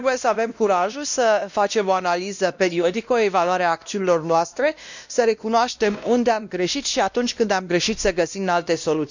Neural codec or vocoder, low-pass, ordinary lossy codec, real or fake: codec, 16 kHz, 1 kbps, X-Codec, WavLM features, trained on Multilingual LibriSpeech; 7.2 kHz; none; fake